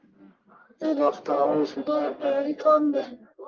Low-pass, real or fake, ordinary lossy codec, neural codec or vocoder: 7.2 kHz; fake; Opus, 24 kbps; codec, 44.1 kHz, 1.7 kbps, Pupu-Codec